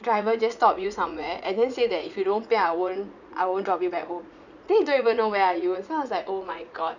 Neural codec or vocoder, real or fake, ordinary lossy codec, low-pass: vocoder, 44.1 kHz, 80 mel bands, Vocos; fake; none; 7.2 kHz